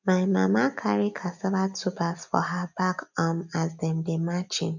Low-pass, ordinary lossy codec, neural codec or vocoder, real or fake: 7.2 kHz; none; none; real